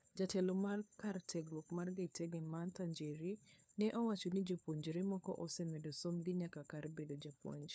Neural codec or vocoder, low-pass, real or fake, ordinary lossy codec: codec, 16 kHz, 4 kbps, FunCodec, trained on LibriTTS, 50 frames a second; none; fake; none